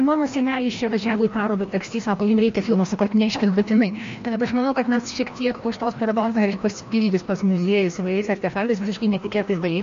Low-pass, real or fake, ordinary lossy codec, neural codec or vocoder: 7.2 kHz; fake; MP3, 48 kbps; codec, 16 kHz, 1 kbps, FreqCodec, larger model